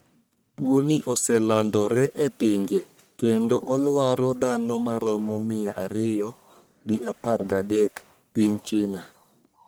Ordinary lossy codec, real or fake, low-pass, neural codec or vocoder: none; fake; none; codec, 44.1 kHz, 1.7 kbps, Pupu-Codec